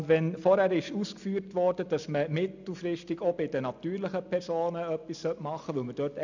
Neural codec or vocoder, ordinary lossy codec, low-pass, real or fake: none; none; 7.2 kHz; real